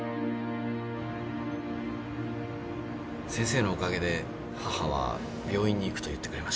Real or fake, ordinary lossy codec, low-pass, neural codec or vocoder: real; none; none; none